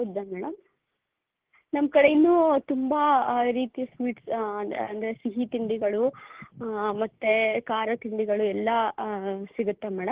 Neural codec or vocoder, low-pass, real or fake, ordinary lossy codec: codec, 16 kHz, 6 kbps, DAC; 3.6 kHz; fake; Opus, 16 kbps